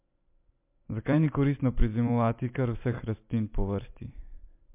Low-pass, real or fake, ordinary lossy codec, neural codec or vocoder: 3.6 kHz; fake; MP3, 32 kbps; vocoder, 44.1 kHz, 128 mel bands every 256 samples, BigVGAN v2